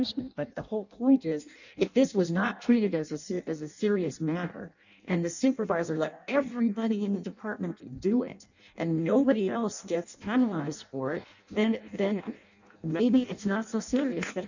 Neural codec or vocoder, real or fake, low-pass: codec, 16 kHz in and 24 kHz out, 0.6 kbps, FireRedTTS-2 codec; fake; 7.2 kHz